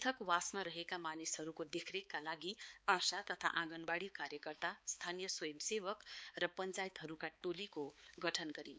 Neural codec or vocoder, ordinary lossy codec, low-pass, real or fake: codec, 16 kHz, 4 kbps, X-Codec, HuBERT features, trained on balanced general audio; none; none; fake